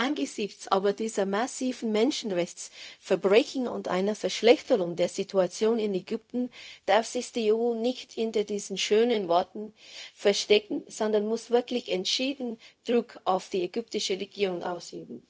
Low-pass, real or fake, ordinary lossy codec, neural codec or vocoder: none; fake; none; codec, 16 kHz, 0.4 kbps, LongCat-Audio-Codec